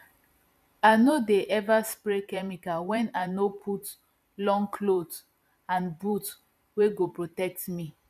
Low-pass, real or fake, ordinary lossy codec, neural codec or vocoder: 14.4 kHz; fake; none; vocoder, 44.1 kHz, 128 mel bands every 512 samples, BigVGAN v2